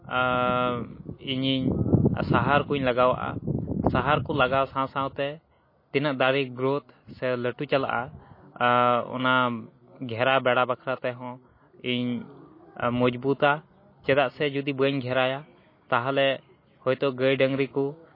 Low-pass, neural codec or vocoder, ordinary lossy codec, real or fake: 5.4 kHz; none; MP3, 24 kbps; real